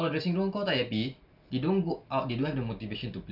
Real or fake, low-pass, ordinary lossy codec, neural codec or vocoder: real; 5.4 kHz; none; none